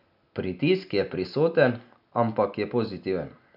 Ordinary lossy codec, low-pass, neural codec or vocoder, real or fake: none; 5.4 kHz; none; real